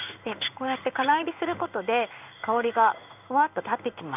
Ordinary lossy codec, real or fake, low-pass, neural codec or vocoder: none; fake; 3.6 kHz; codec, 16 kHz in and 24 kHz out, 1 kbps, XY-Tokenizer